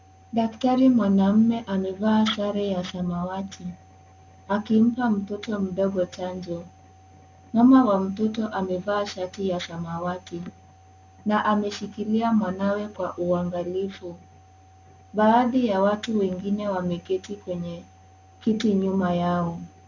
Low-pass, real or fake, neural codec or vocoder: 7.2 kHz; real; none